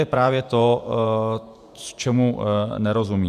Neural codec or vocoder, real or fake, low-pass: vocoder, 48 kHz, 128 mel bands, Vocos; fake; 14.4 kHz